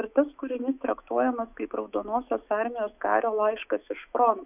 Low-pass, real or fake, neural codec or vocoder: 3.6 kHz; fake; autoencoder, 48 kHz, 128 numbers a frame, DAC-VAE, trained on Japanese speech